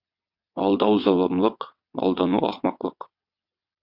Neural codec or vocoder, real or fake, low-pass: vocoder, 22.05 kHz, 80 mel bands, WaveNeXt; fake; 5.4 kHz